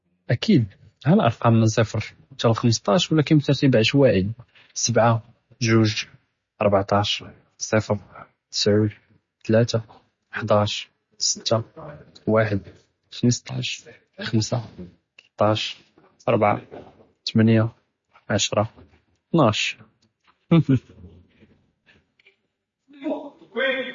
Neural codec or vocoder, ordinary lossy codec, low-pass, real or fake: none; MP3, 32 kbps; 7.2 kHz; real